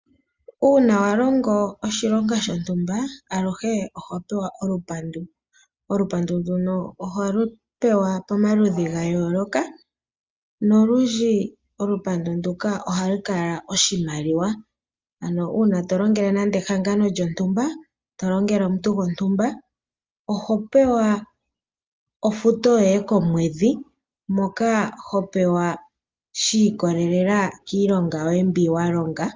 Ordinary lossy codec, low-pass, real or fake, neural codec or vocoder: Opus, 32 kbps; 7.2 kHz; real; none